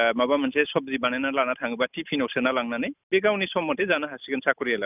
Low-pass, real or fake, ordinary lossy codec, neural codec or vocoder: 3.6 kHz; real; none; none